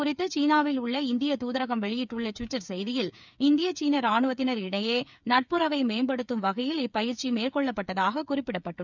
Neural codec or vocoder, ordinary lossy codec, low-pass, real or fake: codec, 16 kHz, 8 kbps, FreqCodec, smaller model; none; 7.2 kHz; fake